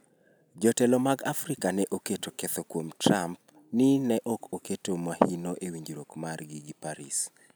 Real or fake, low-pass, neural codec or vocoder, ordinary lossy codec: real; none; none; none